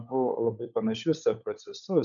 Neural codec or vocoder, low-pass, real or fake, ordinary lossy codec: codec, 16 kHz, 16 kbps, FunCodec, trained on LibriTTS, 50 frames a second; 7.2 kHz; fake; MP3, 96 kbps